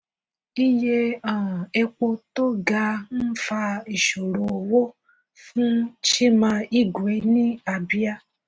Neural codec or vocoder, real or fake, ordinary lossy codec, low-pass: none; real; none; none